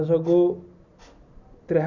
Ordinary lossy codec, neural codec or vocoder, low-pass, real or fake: none; none; 7.2 kHz; real